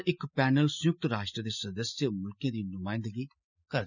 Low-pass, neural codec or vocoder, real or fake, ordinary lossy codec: 7.2 kHz; none; real; none